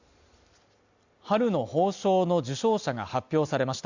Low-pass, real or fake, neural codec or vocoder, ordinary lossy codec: 7.2 kHz; real; none; Opus, 64 kbps